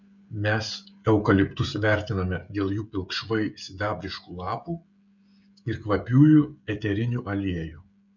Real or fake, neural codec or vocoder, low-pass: fake; codec, 16 kHz, 16 kbps, FreqCodec, smaller model; 7.2 kHz